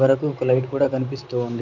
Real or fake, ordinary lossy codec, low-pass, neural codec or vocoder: fake; none; 7.2 kHz; vocoder, 44.1 kHz, 128 mel bands, Pupu-Vocoder